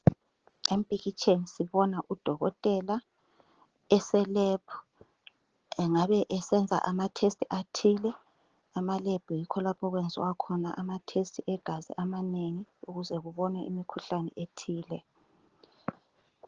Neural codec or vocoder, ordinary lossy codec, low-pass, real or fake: none; Opus, 16 kbps; 7.2 kHz; real